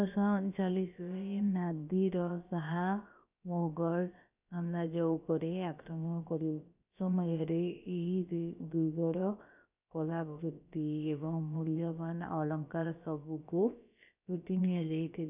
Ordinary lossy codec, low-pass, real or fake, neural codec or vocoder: none; 3.6 kHz; fake; codec, 16 kHz, about 1 kbps, DyCAST, with the encoder's durations